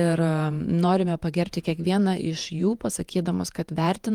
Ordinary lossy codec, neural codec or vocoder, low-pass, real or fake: Opus, 32 kbps; vocoder, 48 kHz, 128 mel bands, Vocos; 19.8 kHz; fake